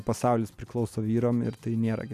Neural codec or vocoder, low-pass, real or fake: none; 14.4 kHz; real